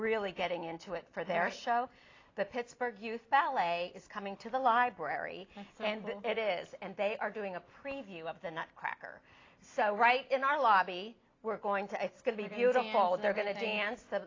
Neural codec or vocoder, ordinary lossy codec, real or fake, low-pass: none; AAC, 32 kbps; real; 7.2 kHz